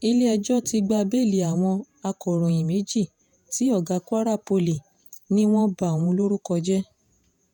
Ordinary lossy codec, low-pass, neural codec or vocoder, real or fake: none; 19.8 kHz; vocoder, 48 kHz, 128 mel bands, Vocos; fake